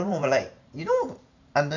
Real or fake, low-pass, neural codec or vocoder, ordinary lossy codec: fake; 7.2 kHz; vocoder, 44.1 kHz, 128 mel bands every 512 samples, BigVGAN v2; none